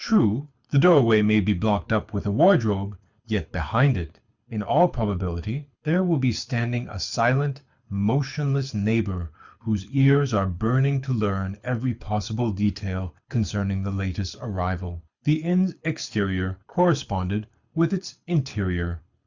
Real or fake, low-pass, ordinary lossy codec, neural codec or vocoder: fake; 7.2 kHz; Opus, 64 kbps; codec, 24 kHz, 6 kbps, HILCodec